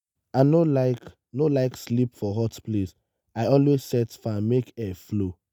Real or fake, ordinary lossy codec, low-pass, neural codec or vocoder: real; none; none; none